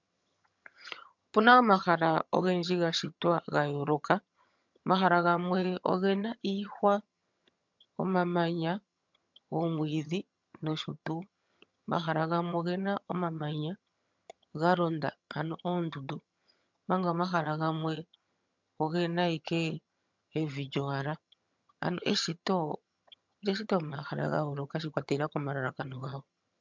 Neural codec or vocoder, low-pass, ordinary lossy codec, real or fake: vocoder, 22.05 kHz, 80 mel bands, HiFi-GAN; 7.2 kHz; MP3, 64 kbps; fake